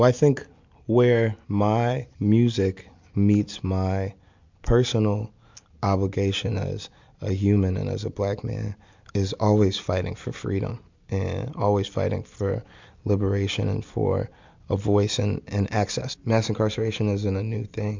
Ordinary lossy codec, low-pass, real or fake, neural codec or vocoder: MP3, 64 kbps; 7.2 kHz; real; none